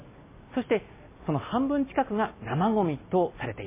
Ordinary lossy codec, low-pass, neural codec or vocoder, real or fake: MP3, 16 kbps; 3.6 kHz; none; real